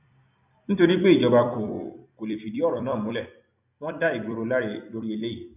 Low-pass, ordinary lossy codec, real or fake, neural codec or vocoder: 3.6 kHz; none; fake; vocoder, 44.1 kHz, 128 mel bands every 256 samples, BigVGAN v2